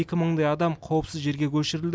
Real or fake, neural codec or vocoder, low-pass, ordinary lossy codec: real; none; none; none